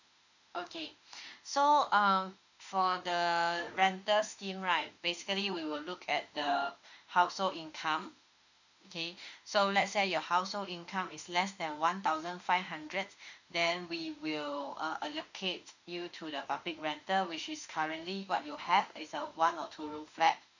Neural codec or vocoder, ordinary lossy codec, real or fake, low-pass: autoencoder, 48 kHz, 32 numbers a frame, DAC-VAE, trained on Japanese speech; none; fake; 7.2 kHz